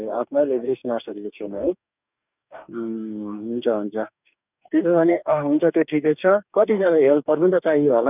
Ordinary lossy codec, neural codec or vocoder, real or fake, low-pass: none; codec, 44.1 kHz, 2.6 kbps, DAC; fake; 3.6 kHz